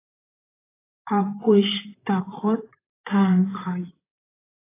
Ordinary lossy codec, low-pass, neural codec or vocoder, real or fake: AAC, 16 kbps; 3.6 kHz; vocoder, 44.1 kHz, 128 mel bands, Pupu-Vocoder; fake